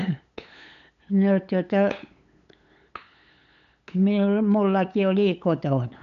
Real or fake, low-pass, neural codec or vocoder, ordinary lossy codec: fake; 7.2 kHz; codec, 16 kHz, 8 kbps, FunCodec, trained on LibriTTS, 25 frames a second; none